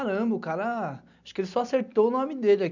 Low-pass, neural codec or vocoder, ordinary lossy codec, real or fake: 7.2 kHz; none; none; real